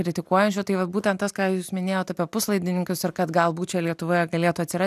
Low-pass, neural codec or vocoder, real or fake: 14.4 kHz; none; real